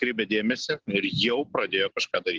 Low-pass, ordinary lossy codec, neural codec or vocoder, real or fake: 7.2 kHz; Opus, 16 kbps; none; real